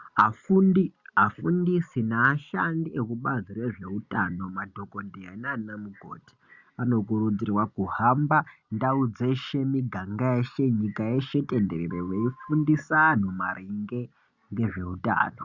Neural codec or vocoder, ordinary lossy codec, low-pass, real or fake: none; Opus, 64 kbps; 7.2 kHz; real